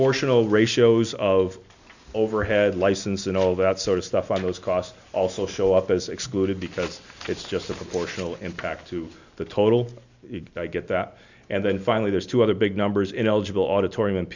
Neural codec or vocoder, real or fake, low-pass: none; real; 7.2 kHz